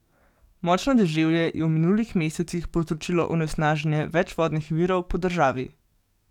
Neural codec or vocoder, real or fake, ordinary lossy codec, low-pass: codec, 44.1 kHz, 7.8 kbps, DAC; fake; none; 19.8 kHz